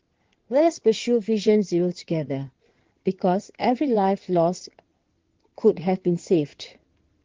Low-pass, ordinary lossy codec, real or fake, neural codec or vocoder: 7.2 kHz; Opus, 16 kbps; fake; codec, 16 kHz in and 24 kHz out, 2.2 kbps, FireRedTTS-2 codec